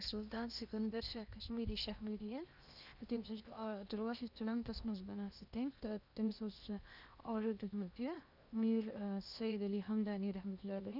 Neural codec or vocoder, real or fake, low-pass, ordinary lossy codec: codec, 16 kHz in and 24 kHz out, 0.8 kbps, FocalCodec, streaming, 65536 codes; fake; 5.4 kHz; none